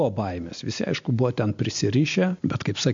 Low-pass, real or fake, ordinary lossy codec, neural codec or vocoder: 7.2 kHz; real; MP3, 48 kbps; none